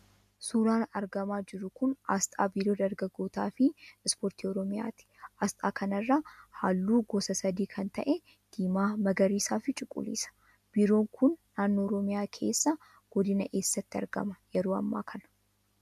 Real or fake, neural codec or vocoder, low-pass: real; none; 14.4 kHz